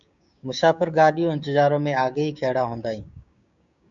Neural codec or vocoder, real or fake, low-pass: codec, 16 kHz, 6 kbps, DAC; fake; 7.2 kHz